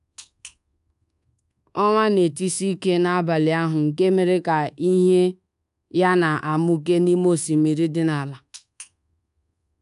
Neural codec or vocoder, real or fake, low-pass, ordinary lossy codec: codec, 24 kHz, 1.2 kbps, DualCodec; fake; 10.8 kHz; none